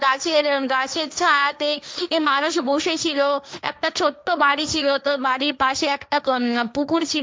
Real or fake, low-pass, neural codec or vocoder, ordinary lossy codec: fake; none; codec, 16 kHz, 1.1 kbps, Voila-Tokenizer; none